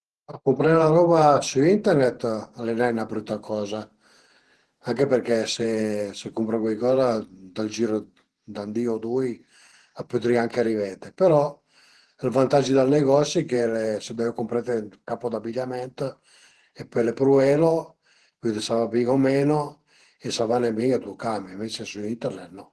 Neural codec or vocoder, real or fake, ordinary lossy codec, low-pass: vocoder, 48 kHz, 128 mel bands, Vocos; fake; Opus, 16 kbps; 10.8 kHz